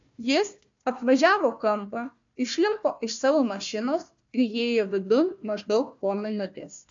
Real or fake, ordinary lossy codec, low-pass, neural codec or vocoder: fake; AAC, 64 kbps; 7.2 kHz; codec, 16 kHz, 1 kbps, FunCodec, trained on Chinese and English, 50 frames a second